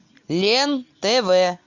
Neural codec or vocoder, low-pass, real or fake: none; 7.2 kHz; real